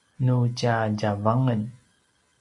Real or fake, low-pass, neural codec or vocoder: real; 10.8 kHz; none